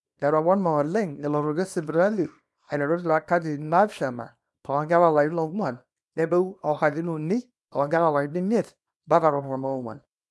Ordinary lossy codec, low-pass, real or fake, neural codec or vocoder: none; none; fake; codec, 24 kHz, 0.9 kbps, WavTokenizer, small release